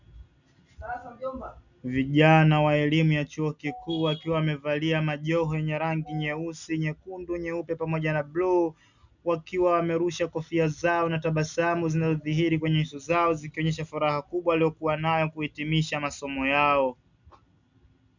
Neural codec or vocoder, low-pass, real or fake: none; 7.2 kHz; real